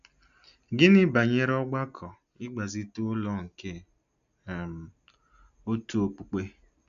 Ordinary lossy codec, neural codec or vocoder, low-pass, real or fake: none; none; 7.2 kHz; real